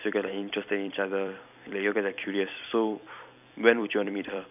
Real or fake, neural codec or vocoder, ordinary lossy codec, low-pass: fake; vocoder, 44.1 kHz, 128 mel bands every 256 samples, BigVGAN v2; none; 3.6 kHz